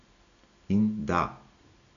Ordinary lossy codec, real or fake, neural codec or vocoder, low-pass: none; real; none; 7.2 kHz